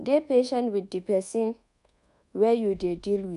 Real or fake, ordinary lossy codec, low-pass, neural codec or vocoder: fake; none; 10.8 kHz; codec, 24 kHz, 1.2 kbps, DualCodec